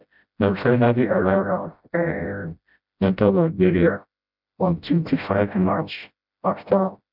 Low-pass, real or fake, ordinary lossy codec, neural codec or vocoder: 5.4 kHz; fake; none; codec, 16 kHz, 0.5 kbps, FreqCodec, smaller model